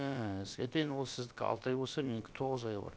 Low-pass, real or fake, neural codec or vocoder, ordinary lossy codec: none; fake; codec, 16 kHz, about 1 kbps, DyCAST, with the encoder's durations; none